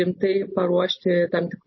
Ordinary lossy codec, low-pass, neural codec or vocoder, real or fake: MP3, 24 kbps; 7.2 kHz; none; real